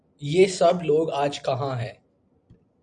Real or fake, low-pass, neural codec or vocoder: real; 9.9 kHz; none